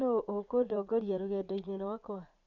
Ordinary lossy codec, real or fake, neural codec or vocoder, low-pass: none; fake; vocoder, 44.1 kHz, 80 mel bands, Vocos; 7.2 kHz